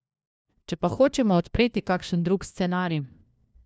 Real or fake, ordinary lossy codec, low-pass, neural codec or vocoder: fake; none; none; codec, 16 kHz, 1 kbps, FunCodec, trained on LibriTTS, 50 frames a second